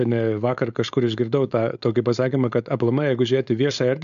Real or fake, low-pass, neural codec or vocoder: fake; 7.2 kHz; codec, 16 kHz, 4.8 kbps, FACodec